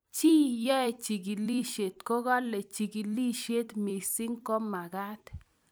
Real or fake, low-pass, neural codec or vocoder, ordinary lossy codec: fake; none; vocoder, 44.1 kHz, 128 mel bands every 256 samples, BigVGAN v2; none